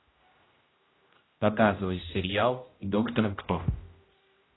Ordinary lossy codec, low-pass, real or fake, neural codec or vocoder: AAC, 16 kbps; 7.2 kHz; fake; codec, 16 kHz, 0.5 kbps, X-Codec, HuBERT features, trained on general audio